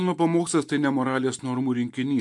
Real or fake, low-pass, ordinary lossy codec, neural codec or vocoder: real; 10.8 kHz; MP3, 64 kbps; none